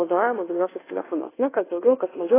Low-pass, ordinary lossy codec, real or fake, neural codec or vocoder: 3.6 kHz; AAC, 16 kbps; fake; codec, 24 kHz, 1.2 kbps, DualCodec